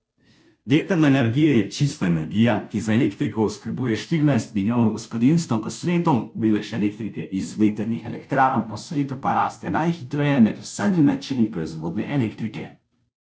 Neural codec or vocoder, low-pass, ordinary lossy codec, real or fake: codec, 16 kHz, 0.5 kbps, FunCodec, trained on Chinese and English, 25 frames a second; none; none; fake